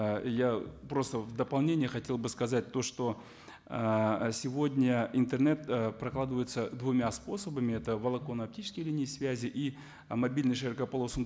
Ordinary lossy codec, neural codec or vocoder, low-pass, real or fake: none; none; none; real